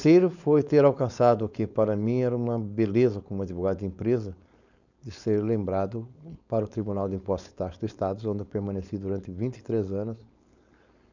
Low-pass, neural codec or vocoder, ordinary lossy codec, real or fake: 7.2 kHz; codec, 16 kHz, 4.8 kbps, FACodec; none; fake